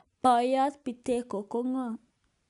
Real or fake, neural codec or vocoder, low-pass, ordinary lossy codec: real; none; 10.8 kHz; none